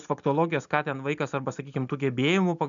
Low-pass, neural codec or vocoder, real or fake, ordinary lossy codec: 7.2 kHz; none; real; MP3, 96 kbps